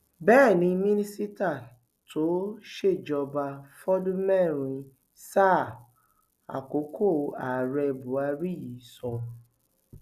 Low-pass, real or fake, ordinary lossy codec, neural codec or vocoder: 14.4 kHz; real; none; none